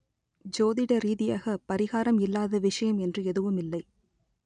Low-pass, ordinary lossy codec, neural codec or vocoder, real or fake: 9.9 kHz; none; none; real